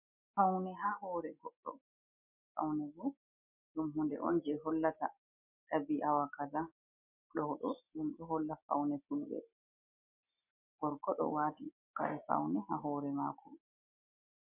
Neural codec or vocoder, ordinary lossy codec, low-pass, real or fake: none; MP3, 32 kbps; 3.6 kHz; real